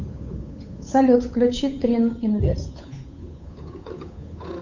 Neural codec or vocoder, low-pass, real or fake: codec, 16 kHz, 8 kbps, FunCodec, trained on Chinese and English, 25 frames a second; 7.2 kHz; fake